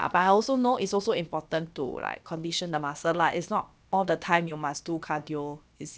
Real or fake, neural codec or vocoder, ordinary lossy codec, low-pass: fake; codec, 16 kHz, about 1 kbps, DyCAST, with the encoder's durations; none; none